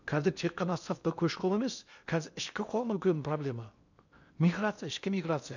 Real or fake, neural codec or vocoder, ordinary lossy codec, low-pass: fake; codec, 16 kHz in and 24 kHz out, 0.8 kbps, FocalCodec, streaming, 65536 codes; none; 7.2 kHz